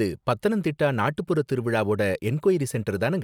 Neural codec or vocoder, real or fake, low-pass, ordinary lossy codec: none; real; 19.8 kHz; none